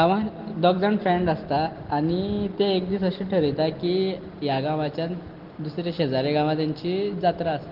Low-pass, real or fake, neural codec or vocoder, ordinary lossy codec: 5.4 kHz; real; none; Opus, 16 kbps